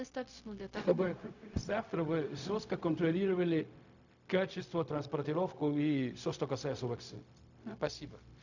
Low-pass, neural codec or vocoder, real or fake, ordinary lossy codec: 7.2 kHz; codec, 16 kHz, 0.4 kbps, LongCat-Audio-Codec; fake; none